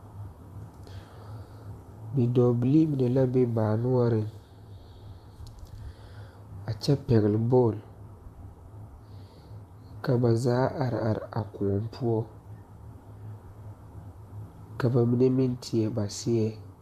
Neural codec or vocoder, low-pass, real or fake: vocoder, 44.1 kHz, 128 mel bands, Pupu-Vocoder; 14.4 kHz; fake